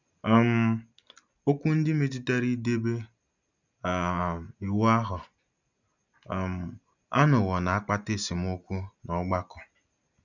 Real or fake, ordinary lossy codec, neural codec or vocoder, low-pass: real; none; none; 7.2 kHz